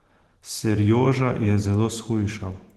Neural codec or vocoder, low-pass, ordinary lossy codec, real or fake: vocoder, 44.1 kHz, 128 mel bands every 512 samples, BigVGAN v2; 14.4 kHz; Opus, 16 kbps; fake